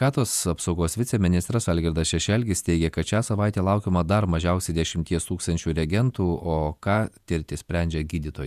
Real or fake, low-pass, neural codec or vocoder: fake; 14.4 kHz; vocoder, 48 kHz, 128 mel bands, Vocos